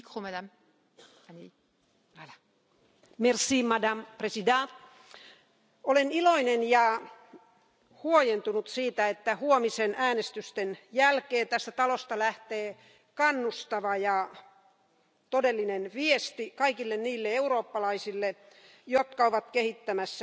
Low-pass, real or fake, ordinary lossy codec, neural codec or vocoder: none; real; none; none